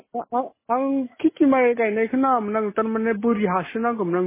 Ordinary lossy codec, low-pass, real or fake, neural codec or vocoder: MP3, 16 kbps; 3.6 kHz; real; none